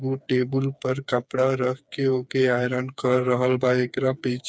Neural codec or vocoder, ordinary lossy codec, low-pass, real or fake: codec, 16 kHz, 4 kbps, FreqCodec, smaller model; none; none; fake